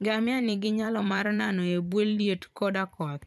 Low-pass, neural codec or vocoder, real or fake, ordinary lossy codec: 14.4 kHz; vocoder, 44.1 kHz, 128 mel bands every 512 samples, BigVGAN v2; fake; none